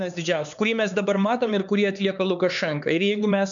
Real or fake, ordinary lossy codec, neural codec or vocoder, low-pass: fake; MP3, 96 kbps; codec, 16 kHz, 4 kbps, X-Codec, HuBERT features, trained on LibriSpeech; 7.2 kHz